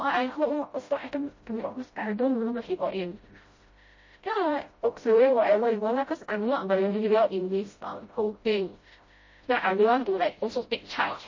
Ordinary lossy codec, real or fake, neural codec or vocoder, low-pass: MP3, 32 kbps; fake; codec, 16 kHz, 0.5 kbps, FreqCodec, smaller model; 7.2 kHz